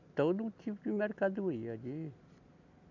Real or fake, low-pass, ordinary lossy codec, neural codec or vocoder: real; 7.2 kHz; none; none